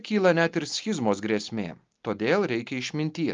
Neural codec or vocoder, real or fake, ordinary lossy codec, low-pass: none; real; Opus, 24 kbps; 7.2 kHz